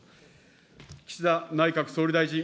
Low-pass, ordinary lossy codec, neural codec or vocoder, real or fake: none; none; none; real